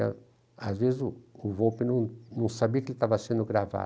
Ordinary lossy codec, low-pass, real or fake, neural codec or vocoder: none; none; real; none